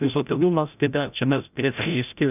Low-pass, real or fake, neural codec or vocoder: 3.6 kHz; fake; codec, 16 kHz, 0.5 kbps, FreqCodec, larger model